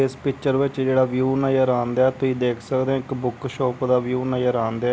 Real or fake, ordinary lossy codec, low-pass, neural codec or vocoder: real; none; none; none